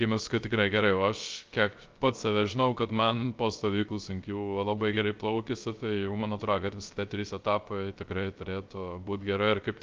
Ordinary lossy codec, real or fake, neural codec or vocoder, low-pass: Opus, 24 kbps; fake; codec, 16 kHz, 0.3 kbps, FocalCodec; 7.2 kHz